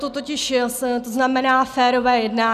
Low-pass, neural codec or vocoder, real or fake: 14.4 kHz; none; real